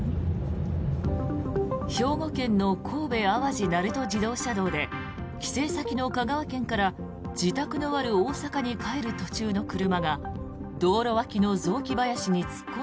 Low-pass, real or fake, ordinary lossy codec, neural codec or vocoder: none; real; none; none